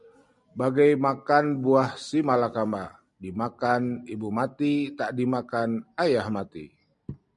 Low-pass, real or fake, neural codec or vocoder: 10.8 kHz; real; none